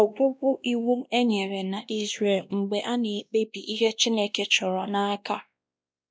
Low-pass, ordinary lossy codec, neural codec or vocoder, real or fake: none; none; codec, 16 kHz, 1 kbps, X-Codec, WavLM features, trained on Multilingual LibriSpeech; fake